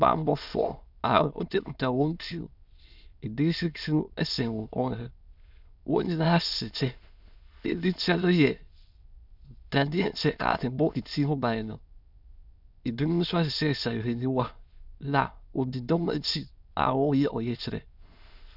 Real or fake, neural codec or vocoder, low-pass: fake; autoencoder, 22.05 kHz, a latent of 192 numbers a frame, VITS, trained on many speakers; 5.4 kHz